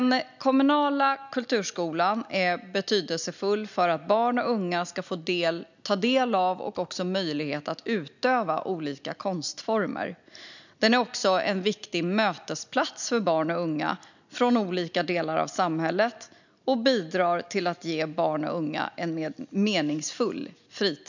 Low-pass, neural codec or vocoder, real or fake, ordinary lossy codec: 7.2 kHz; none; real; none